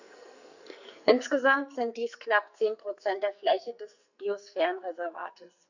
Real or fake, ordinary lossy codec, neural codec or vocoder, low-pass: fake; none; codec, 44.1 kHz, 2.6 kbps, SNAC; 7.2 kHz